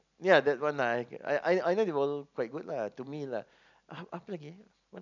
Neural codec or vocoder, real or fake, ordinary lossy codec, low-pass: none; real; none; 7.2 kHz